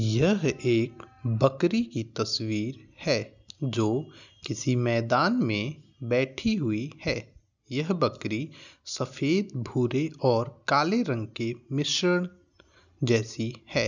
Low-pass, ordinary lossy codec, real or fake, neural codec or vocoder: 7.2 kHz; none; real; none